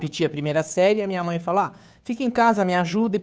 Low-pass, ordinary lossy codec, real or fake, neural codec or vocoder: none; none; fake; codec, 16 kHz, 2 kbps, FunCodec, trained on Chinese and English, 25 frames a second